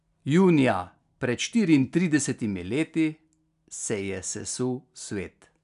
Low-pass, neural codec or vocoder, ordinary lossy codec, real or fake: 10.8 kHz; none; none; real